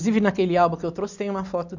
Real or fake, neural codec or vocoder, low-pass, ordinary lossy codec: real; none; 7.2 kHz; none